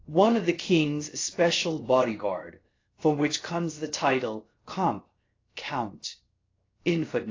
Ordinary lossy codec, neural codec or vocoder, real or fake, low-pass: AAC, 32 kbps; codec, 16 kHz, 0.7 kbps, FocalCodec; fake; 7.2 kHz